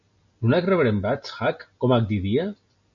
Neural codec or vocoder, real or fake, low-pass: none; real; 7.2 kHz